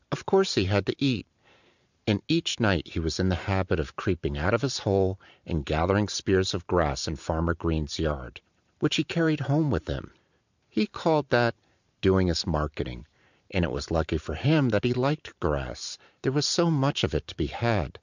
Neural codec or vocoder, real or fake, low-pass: vocoder, 44.1 kHz, 128 mel bands every 512 samples, BigVGAN v2; fake; 7.2 kHz